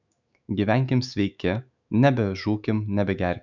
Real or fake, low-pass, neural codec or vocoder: fake; 7.2 kHz; autoencoder, 48 kHz, 128 numbers a frame, DAC-VAE, trained on Japanese speech